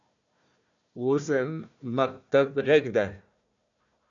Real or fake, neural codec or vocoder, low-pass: fake; codec, 16 kHz, 1 kbps, FunCodec, trained on Chinese and English, 50 frames a second; 7.2 kHz